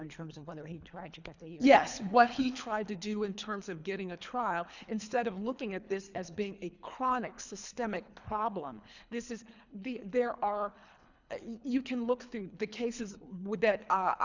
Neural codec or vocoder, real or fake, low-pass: codec, 24 kHz, 3 kbps, HILCodec; fake; 7.2 kHz